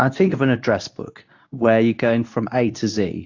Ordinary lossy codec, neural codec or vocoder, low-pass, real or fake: AAC, 48 kbps; codec, 24 kHz, 0.9 kbps, WavTokenizer, medium speech release version 2; 7.2 kHz; fake